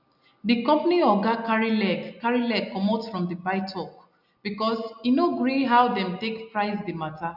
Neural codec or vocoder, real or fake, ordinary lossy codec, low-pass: none; real; none; 5.4 kHz